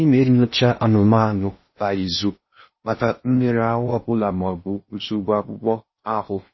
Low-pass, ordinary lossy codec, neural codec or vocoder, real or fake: 7.2 kHz; MP3, 24 kbps; codec, 16 kHz in and 24 kHz out, 0.6 kbps, FocalCodec, streaming, 4096 codes; fake